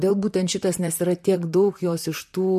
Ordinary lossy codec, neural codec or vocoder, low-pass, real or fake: MP3, 64 kbps; vocoder, 44.1 kHz, 128 mel bands, Pupu-Vocoder; 14.4 kHz; fake